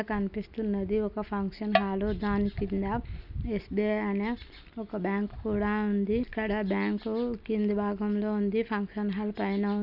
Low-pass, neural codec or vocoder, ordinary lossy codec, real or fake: 5.4 kHz; none; none; real